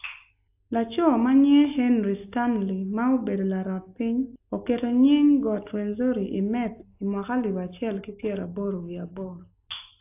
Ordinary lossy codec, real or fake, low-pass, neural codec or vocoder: AAC, 32 kbps; real; 3.6 kHz; none